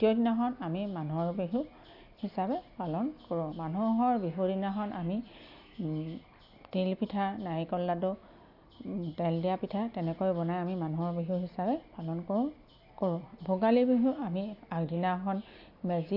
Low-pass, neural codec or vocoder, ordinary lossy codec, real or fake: 5.4 kHz; none; AAC, 48 kbps; real